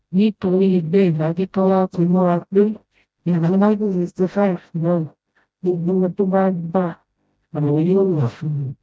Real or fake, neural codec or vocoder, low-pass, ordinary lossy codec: fake; codec, 16 kHz, 0.5 kbps, FreqCodec, smaller model; none; none